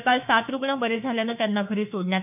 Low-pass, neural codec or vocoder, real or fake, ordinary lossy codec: 3.6 kHz; autoencoder, 48 kHz, 32 numbers a frame, DAC-VAE, trained on Japanese speech; fake; none